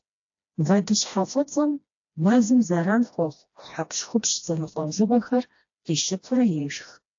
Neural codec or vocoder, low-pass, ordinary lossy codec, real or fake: codec, 16 kHz, 1 kbps, FreqCodec, smaller model; 7.2 kHz; AAC, 48 kbps; fake